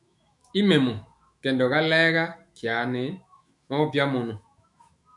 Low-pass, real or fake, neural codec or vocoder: 10.8 kHz; fake; autoencoder, 48 kHz, 128 numbers a frame, DAC-VAE, trained on Japanese speech